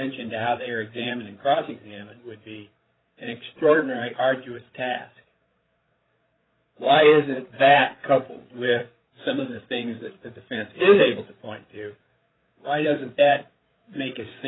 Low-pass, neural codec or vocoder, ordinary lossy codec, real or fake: 7.2 kHz; codec, 16 kHz, 8 kbps, FreqCodec, larger model; AAC, 16 kbps; fake